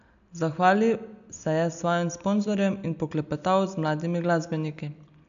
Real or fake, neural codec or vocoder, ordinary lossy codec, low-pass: real; none; AAC, 96 kbps; 7.2 kHz